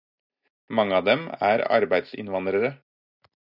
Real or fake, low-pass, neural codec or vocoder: real; 5.4 kHz; none